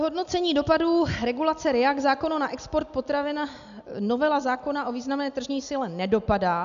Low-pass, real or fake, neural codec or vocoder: 7.2 kHz; real; none